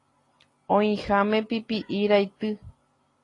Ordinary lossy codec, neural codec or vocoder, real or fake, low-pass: AAC, 32 kbps; none; real; 10.8 kHz